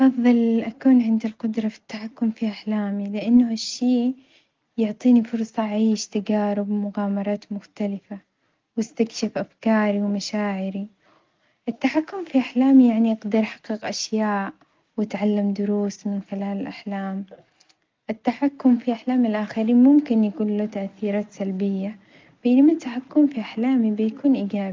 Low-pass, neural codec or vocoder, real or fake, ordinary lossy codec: 7.2 kHz; none; real; Opus, 32 kbps